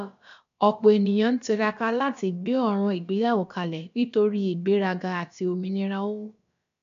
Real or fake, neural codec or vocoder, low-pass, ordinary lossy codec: fake; codec, 16 kHz, about 1 kbps, DyCAST, with the encoder's durations; 7.2 kHz; none